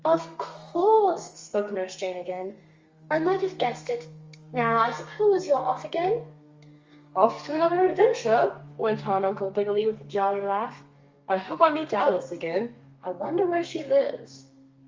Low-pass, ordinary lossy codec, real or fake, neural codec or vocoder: 7.2 kHz; Opus, 32 kbps; fake; codec, 32 kHz, 1.9 kbps, SNAC